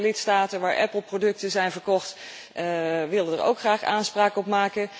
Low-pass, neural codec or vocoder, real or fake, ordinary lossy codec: none; none; real; none